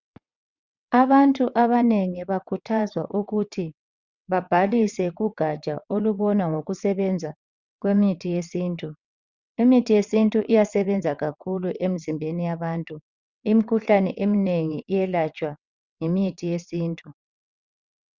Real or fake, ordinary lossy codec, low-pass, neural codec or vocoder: fake; Opus, 64 kbps; 7.2 kHz; vocoder, 22.05 kHz, 80 mel bands, WaveNeXt